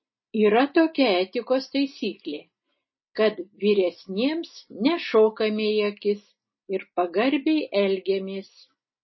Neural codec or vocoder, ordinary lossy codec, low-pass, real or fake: none; MP3, 24 kbps; 7.2 kHz; real